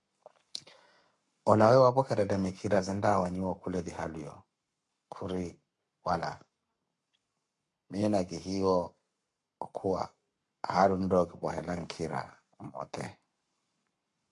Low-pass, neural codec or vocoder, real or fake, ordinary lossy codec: 10.8 kHz; codec, 44.1 kHz, 7.8 kbps, Pupu-Codec; fake; MP3, 64 kbps